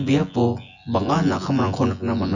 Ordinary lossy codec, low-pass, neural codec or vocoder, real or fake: none; 7.2 kHz; vocoder, 24 kHz, 100 mel bands, Vocos; fake